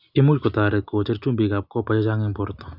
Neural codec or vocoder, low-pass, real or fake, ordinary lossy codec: none; 5.4 kHz; real; none